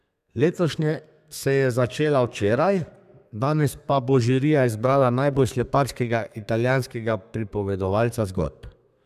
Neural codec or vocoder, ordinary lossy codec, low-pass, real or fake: codec, 32 kHz, 1.9 kbps, SNAC; none; 14.4 kHz; fake